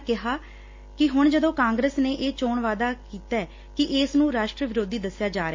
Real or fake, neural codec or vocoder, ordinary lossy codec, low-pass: real; none; MP3, 32 kbps; 7.2 kHz